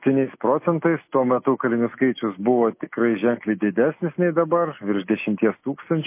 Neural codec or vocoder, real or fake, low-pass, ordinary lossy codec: none; real; 3.6 kHz; MP3, 24 kbps